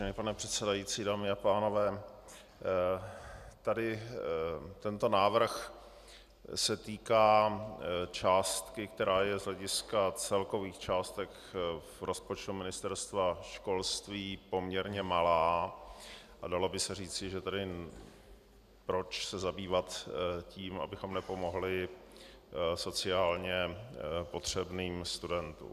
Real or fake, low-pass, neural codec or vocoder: real; 14.4 kHz; none